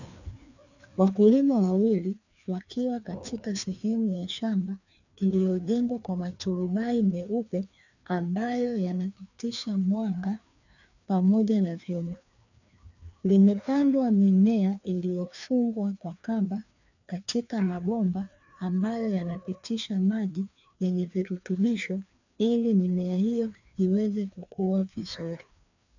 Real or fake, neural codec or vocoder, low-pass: fake; codec, 16 kHz, 2 kbps, FreqCodec, larger model; 7.2 kHz